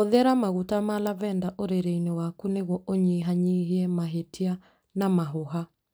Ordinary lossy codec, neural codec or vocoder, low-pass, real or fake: none; none; none; real